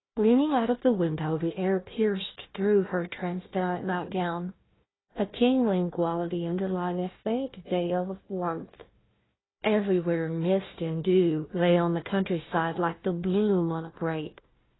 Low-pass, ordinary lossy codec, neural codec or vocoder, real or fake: 7.2 kHz; AAC, 16 kbps; codec, 16 kHz, 1 kbps, FunCodec, trained on Chinese and English, 50 frames a second; fake